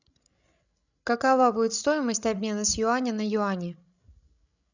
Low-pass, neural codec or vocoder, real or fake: 7.2 kHz; codec, 16 kHz, 16 kbps, FreqCodec, larger model; fake